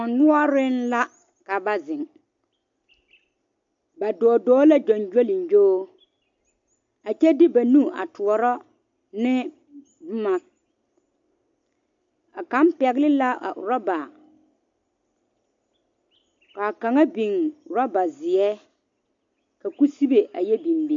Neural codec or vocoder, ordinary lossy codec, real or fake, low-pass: none; MP3, 48 kbps; real; 7.2 kHz